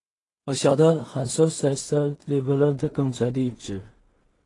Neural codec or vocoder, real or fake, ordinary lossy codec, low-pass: codec, 16 kHz in and 24 kHz out, 0.4 kbps, LongCat-Audio-Codec, two codebook decoder; fake; AAC, 32 kbps; 10.8 kHz